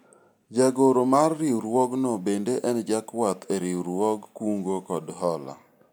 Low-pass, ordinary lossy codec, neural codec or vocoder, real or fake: none; none; none; real